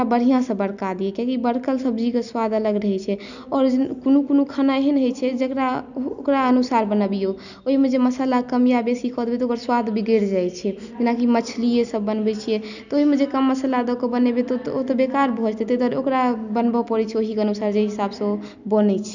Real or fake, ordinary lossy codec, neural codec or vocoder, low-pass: real; none; none; 7.2 kHz